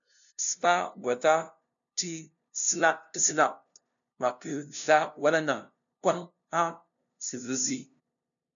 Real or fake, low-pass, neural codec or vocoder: fake; 7.2 kHz; codec, 16 kHz, 0.5 kbps, FunCodec, trained on LibriTTS, 25 frames a second